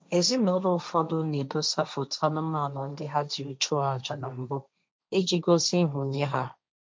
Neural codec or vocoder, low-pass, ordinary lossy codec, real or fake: codec, 16 kHz, 1.1 kbps, Voila-Tokenizer; none; none; fake